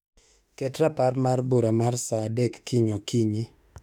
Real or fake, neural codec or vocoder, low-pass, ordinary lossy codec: fake; autoencoder, 48 kHz, 32 numbers a frame, DAC-VAE, trained on Japanese speech; 19.8 kHz; none